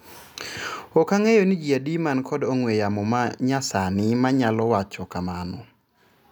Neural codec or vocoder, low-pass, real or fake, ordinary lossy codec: none; none; real; none